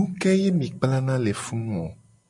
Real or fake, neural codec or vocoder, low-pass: real; none; 10.8 kHz